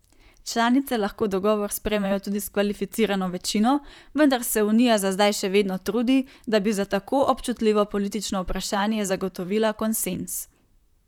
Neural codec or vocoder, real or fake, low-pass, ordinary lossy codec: vocoder, 44.1 kHz, 128 mel bands, Pupu-Vocoder; fake; 19.8 kHz; none